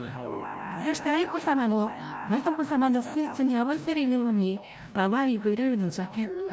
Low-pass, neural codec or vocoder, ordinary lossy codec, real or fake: none; codec, 16 kHz, 0.5 kbps, FreqCodec, larger model; none; fake